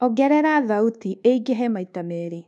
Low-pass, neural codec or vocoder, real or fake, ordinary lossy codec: none; codec, 24 kHz, 1.2 kbps, DualCodec; fake; none